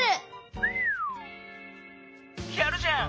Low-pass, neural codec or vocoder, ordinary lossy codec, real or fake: none; none; none; real